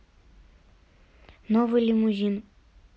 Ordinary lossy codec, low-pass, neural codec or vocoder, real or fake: none; none; none; real